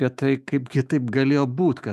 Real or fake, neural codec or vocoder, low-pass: fake; autoencoder, 48 kHz, 128 numbers a frame, DAC-VAE, trained on Japanese speech; 14.4 kHz